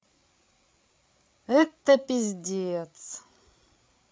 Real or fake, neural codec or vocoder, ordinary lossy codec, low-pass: fake; codec, 16 kHz, 8 kbps, FreqCodec, larger model; none; none